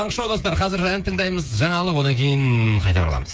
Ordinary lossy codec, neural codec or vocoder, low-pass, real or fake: none; codec, 16 kHz, 8 kbps, FreqCodec, smaller model; none; fake